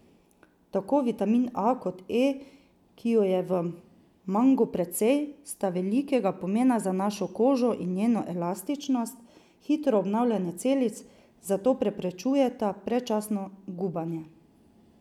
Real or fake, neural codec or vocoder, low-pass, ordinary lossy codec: real; none; 19.8 kHz; none